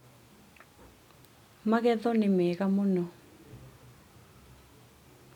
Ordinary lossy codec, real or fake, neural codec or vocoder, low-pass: none; real; none; 19.8 kHz